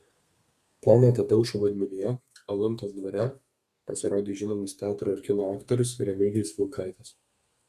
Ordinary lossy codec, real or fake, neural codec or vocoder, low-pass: Opus, 64 kbps; fake; codec, 32 kHz, 1.9 kbps, SNAC; 14.4 kHz